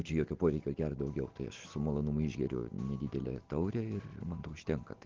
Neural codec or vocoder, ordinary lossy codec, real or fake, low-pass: none; Opus, 16 kbps; real; 7.2 kHz